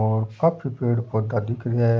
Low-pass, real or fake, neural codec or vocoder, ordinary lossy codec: none; real; none; none